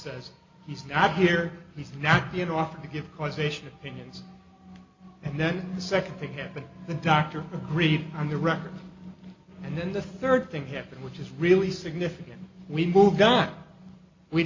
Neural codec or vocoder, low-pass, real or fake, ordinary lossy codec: none; 7.2 kHz; real; MP3, 48 kbps